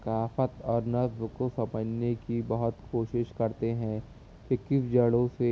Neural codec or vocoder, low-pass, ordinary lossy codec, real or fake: none; none; none; real